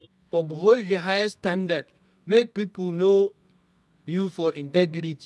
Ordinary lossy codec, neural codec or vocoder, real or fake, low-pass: none; codec, 24 kHz, 0.9 kbps, WavTokenizer, medium music audio release; fake; none